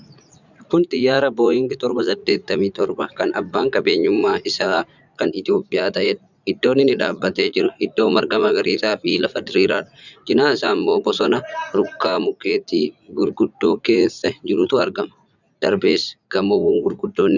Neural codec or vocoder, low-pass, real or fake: vocoder, 44.1 kHz, 80 mel bands, Vocos; 7.2 kHz; fake